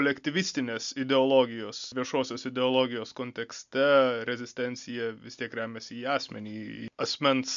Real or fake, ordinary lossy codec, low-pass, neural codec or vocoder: real; MP3, 64 kbps; 7.2 kHz; none